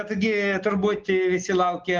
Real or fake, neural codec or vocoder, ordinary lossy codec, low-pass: real; none; Opus, 16 kbps; 7.2 kHz